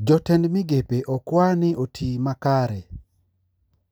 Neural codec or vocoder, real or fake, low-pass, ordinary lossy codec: none; real; none; none